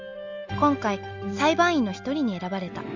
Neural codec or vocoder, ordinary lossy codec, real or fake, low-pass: none; Opus, 32 kbps; real; 7.2 kHz